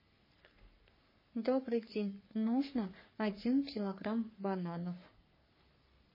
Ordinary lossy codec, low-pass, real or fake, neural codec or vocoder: MP3, 24 kbps; 5.4 kHz; fake; codec, 44.1 kHz, 3.4 kbps, Pupu-Codec